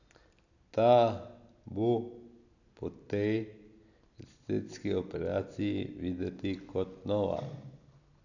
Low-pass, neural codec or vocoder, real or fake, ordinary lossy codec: 7.2 kHz; none; real; none